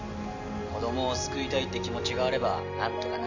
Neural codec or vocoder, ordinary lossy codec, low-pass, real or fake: none; none; 7.2 kHz; real